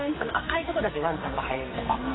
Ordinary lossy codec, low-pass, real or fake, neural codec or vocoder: AAC, 16 kbps; 7.2 kHz; fake; codec, 44.1 kHz, 2.6 kbps, SNAC